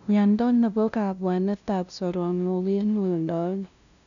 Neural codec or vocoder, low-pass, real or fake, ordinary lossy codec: codec, 16 kHz, 0.5 kbps, FunCodec, trained on LibriTTS, 25 frames a second; 7.2 kHz; fake; none